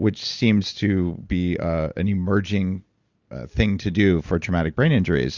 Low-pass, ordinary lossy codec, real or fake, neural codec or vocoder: 7.2 kHz; Opus, 64 kbps; real; none